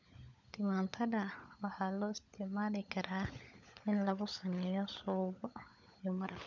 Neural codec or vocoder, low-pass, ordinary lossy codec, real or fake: codec, 16 kHz, 16 kbps, FunCodec, trained on LibriTTS, 50 frames a second; 7.2 kHz; none; fake